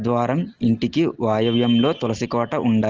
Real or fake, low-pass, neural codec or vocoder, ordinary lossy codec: real; 7.2 kHz; none; Opus, 16 kbps